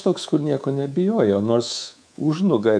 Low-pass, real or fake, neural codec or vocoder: 9.9 kHz; fake; codec, 24 kHz, 3.1 kbps, DualCodec